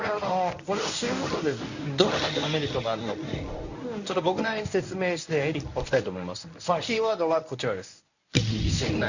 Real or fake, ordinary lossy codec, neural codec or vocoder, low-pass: fake; none; codec, 24 kHz, 0.9 kbps, WavTokenizer, medium speech release version 1; 7.2 kHz